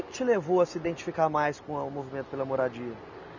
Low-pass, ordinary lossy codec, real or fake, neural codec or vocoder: 7.2 kHz; none; real; none